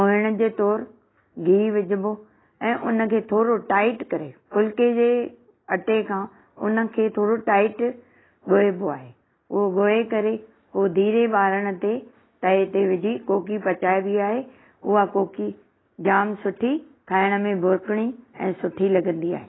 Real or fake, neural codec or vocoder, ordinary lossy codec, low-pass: real; none; AAC, 16 kbps; 7.2 kHz